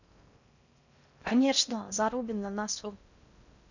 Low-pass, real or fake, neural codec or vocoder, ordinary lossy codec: 7.2 kHz; fake; codec, 16 kHz in and 24 kHz out, 0.6 kbps, FocalCodec, streaming, 4096 codes; none